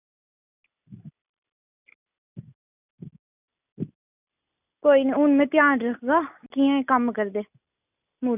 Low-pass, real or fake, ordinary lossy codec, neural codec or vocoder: 3.6 kHz; real; none; none